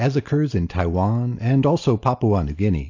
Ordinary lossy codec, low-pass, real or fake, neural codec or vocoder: AAC, 48 kbps; 7.2 kHz; real; none